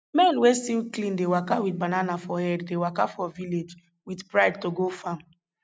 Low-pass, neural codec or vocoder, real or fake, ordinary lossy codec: none; none; real; none